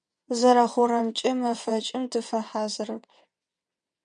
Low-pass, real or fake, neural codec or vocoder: 9.9 kHz; fake; vocoder, 22.05 kHz, 80 mel bands, WaveNeXt